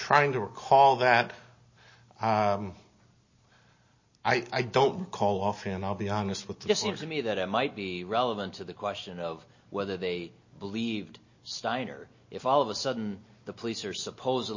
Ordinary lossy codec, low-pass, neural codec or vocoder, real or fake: MP3, 32 kbps; 7.2 kHz; none; real